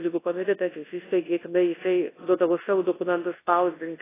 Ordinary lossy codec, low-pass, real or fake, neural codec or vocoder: AAC, 16 kbps; 3.6 kHz; fake; codec, 24 kHz, 0.9 kbps, WavTokenizer, large speech release